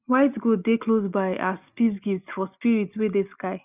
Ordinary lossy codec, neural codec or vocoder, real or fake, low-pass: none; none; real; 3.6 kHz